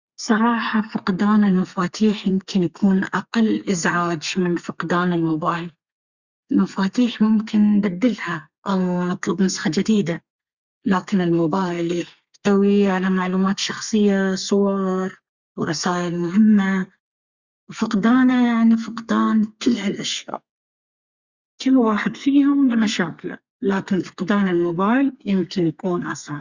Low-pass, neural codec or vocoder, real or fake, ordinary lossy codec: 7.2 kHz; codec, 32 kHz, 1.9 kbps, SNAC; fake; Opus, 64 kbps